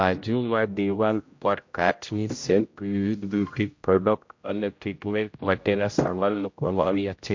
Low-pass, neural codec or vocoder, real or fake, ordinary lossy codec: 7.2 kHz; codec, 16 kHz, 0.5 kbps, X-Codec, HuBERT features, trained on general audio; fake; MP3, 48 kbps